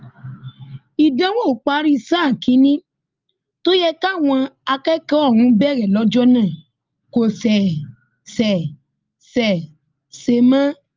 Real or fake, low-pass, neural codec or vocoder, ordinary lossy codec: real; 7.2 kHz; none; Opus, 24 kbps